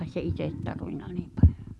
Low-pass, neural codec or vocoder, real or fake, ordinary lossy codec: none; none; real; none